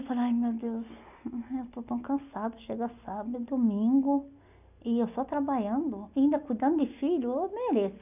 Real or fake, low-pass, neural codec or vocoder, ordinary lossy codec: real; 3.6 kHz; none; none